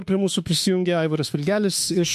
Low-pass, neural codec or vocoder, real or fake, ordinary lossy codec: 14.4 kHz; autoencoder, 48 kHz, 32 numbers a frame, DAC-VAE, trained on Japanese speech; fake; MP3, 64 kbps